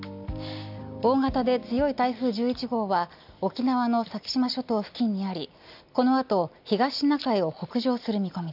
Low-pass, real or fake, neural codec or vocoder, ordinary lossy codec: 5.4 kHz; real; none; none